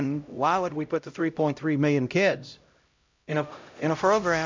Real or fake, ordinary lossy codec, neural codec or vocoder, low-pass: fake; MP3, 64 kbps; codec, 16 kHz, 0.5 kbps, X-Codec, HuBERT features, trained on LibriSpeech; 7.2 kHz